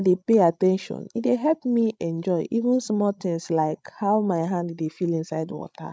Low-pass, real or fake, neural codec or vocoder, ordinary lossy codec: none; fake; codec, 16 kHz, 16 kbps, FreqCodec, larger model; none